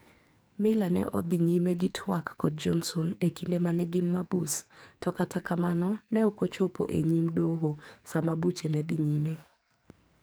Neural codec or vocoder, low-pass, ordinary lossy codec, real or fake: codec, 44.1 kHz, 2.6 kbps, SNAC; none; none; fake